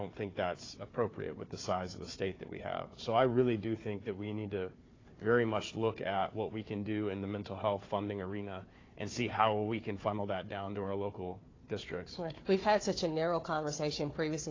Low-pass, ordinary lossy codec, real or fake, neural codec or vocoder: 7.2 kHz; AAC, 32 kbps; fake; codec, 16 kHz, 4 kbps, FunCodec, trained on Chinese and English, 50 frames a second